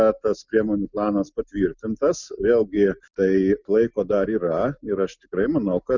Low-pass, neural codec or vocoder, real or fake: 7.2 kHz; none; real